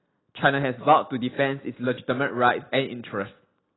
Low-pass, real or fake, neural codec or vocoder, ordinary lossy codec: 7.2 kHz; real; none; AAC, 16 kbps